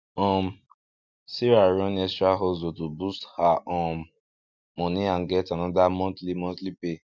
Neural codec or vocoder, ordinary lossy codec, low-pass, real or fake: none; none; 7.2 kHz; real